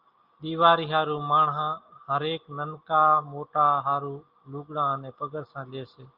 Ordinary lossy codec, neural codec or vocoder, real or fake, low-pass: Opus, 32 kbps; none; real; 5.4 kHz